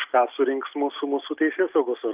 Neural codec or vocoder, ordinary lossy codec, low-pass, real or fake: none; Opus, 32 kbps; 3.6 kHz; real